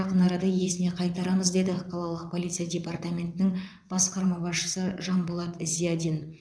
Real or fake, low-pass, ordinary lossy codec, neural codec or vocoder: fake; none; none; vocoder, 22.05 kHz, 80 mel bands, WaveNeXt